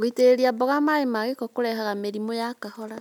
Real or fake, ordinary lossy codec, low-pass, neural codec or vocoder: real; none; 19.8 kHz; none